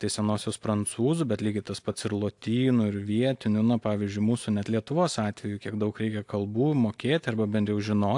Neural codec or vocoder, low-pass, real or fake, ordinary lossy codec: none; 10.8 kHz; real; AAC, 64 kbps